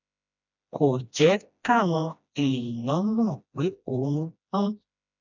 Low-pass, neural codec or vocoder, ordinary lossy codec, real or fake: 7.2 kHz; codec, 16 kHz, 1 kbps, FreqCodec, smaller model; none; fake